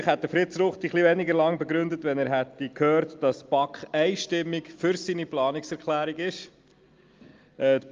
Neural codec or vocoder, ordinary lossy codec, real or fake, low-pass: none; Opus, 32 kbps; real; 7.2 kHz